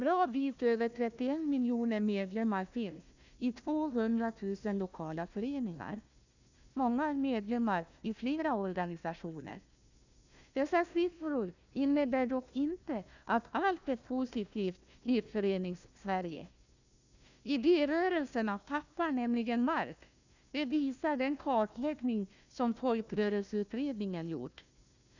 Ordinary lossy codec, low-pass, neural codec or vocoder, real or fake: none; 7.2 kHz; codec, 16 kHz, 1 kbps, FunCodec, trained on Chinese and English, 50 frames a second; fake